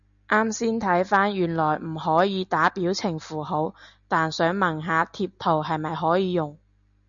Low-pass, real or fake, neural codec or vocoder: 7.2 kHz; real; none